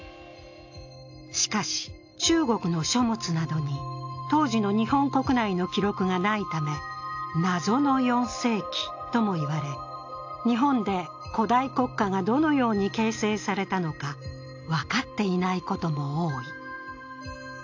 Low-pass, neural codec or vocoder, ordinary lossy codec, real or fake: 7.2 kHz; none; AAC, 48 kbps; real